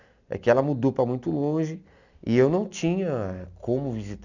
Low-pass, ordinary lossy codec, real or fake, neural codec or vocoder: 7.2 kHz; none; real; none